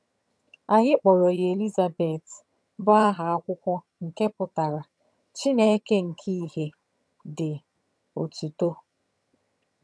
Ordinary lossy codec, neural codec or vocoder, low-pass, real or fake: none; vocoder, 22.05 kHz, 80 mel bands, HiFi-GAN; none; fake